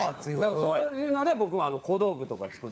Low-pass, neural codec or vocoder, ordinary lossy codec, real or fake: none; codec, 16 kHz, 4 kbps, FunCodec, trained on LibriTTS, 50 frames a second; none; fake